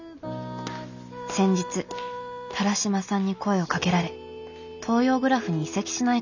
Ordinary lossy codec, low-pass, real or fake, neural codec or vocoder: none; 7.2 kHz; real; none